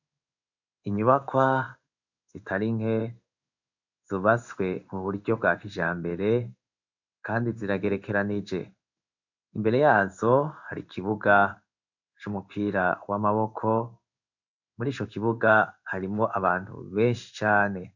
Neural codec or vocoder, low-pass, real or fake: codec, 16 kHz in and 24 kHz out, 1 kbps, XY-Tokenizer; 7.2 kHz; fake